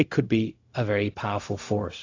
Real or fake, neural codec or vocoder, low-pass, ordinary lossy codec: fake; codec, 16 kHz, 0.4 kbps, LongCat-Audio-Codec; 7.2 kHz; AAC, 32 kbps